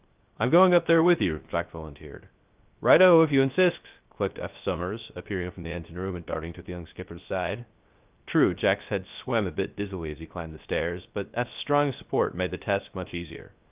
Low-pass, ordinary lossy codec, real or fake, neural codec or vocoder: 3.6 kHz; Opus, 24 kbps; fake; codec, 16 kHz, 0.3 kbps, FocalCodec